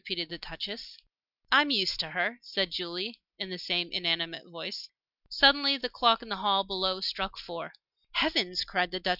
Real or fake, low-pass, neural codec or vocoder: real; 5.4 kHz; none